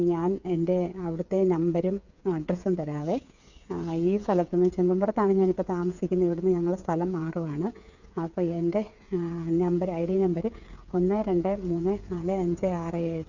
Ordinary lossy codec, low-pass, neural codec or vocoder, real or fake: none; 7.2 kHz; codec, 16 kHz, 8 kbps, FreqCodec, smaller model; fake